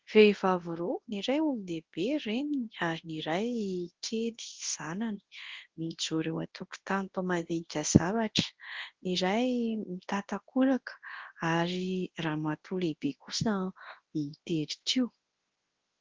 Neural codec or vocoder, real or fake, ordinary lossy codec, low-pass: codec, 24 kHz, 0.9 kbps, WavTokenizer, large speech release; fake; Opus, 16 kbps; 7.2 kHz